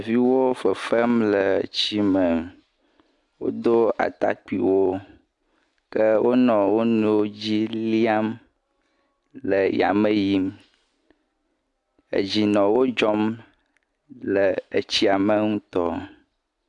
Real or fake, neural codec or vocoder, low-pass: real; none; 10.8 kHz